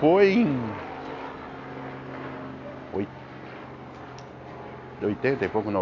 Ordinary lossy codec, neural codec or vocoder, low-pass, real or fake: AAC, 48 kbps; none; 7.2 kHz; real